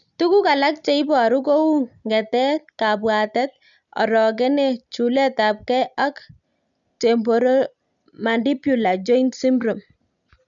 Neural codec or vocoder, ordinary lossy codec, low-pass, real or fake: none; none; 7.2 kHz; real